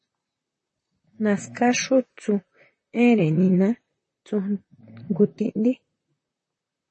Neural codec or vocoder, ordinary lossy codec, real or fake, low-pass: vocoder, 44.1 kHz, 128 mel bands, Pupu-Vocoder; MP3, 32 kbps; fake; 10.8 kHz